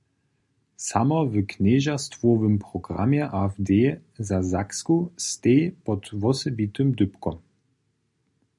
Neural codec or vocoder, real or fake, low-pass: none; real; 10.8 kHz